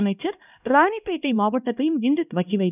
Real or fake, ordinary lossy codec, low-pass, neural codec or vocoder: fake; none; 3.6 kHz; codec, 16 kHz, 1 kbps, X-Codec, HuBERT features, trained on LibriSpeech